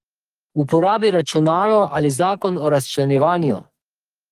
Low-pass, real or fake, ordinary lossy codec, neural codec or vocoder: 14.4 kHz; fake; Opus, 24 kbps; codec, 44.1 kHz, 2.6 kbps, SNAC